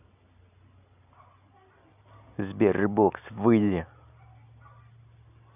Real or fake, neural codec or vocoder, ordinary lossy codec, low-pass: real; none; none; 3.6 kHz